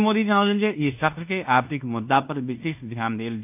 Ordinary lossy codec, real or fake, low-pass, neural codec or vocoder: AAC, 32 kbps; fake; 3.6 kHz; codec, 16 kHz in and 24 kHz out, 0.9 kbps, LongCat-Audio-Codec, fine tuned four codebook decoder